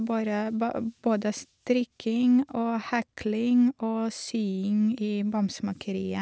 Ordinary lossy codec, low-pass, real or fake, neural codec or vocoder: none; none; real; none